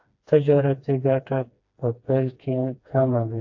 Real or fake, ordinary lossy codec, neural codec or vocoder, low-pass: fake; AAC, 48 kbps; codec, 16 kHz, 2 kbps, FreqCodec, smaller model; 7.2 kHz